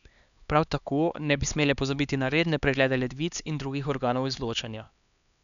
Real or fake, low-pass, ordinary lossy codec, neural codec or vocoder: fake; 7.2 kHz; none; codec, 16 kHz, 2 kbps, X-Codec, HuBERT features, trained on LibriSpeech